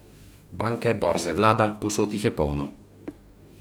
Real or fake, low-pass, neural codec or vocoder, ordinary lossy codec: fake; none; codec, 44.1 kHz, 2.6 kbps, DAC; none